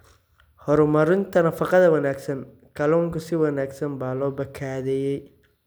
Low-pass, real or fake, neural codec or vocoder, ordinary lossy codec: none; real; none; none